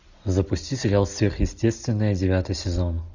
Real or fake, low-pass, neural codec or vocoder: real; 7.2 kHz; none